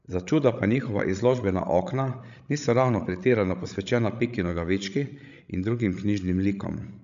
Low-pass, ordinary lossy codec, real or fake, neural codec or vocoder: 7.2 kHz; none; fake; codec, 16 kHz, 16 kbps, FreqCodec, larger model